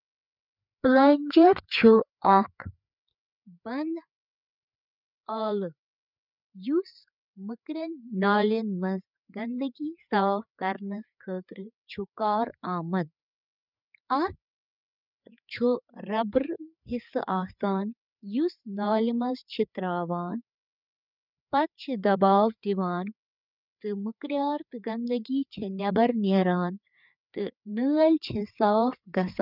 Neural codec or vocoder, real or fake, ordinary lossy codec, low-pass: codec, 16 kHz, 4 kbps, FreqCodec, larger model; fake; none; 5.4 kHz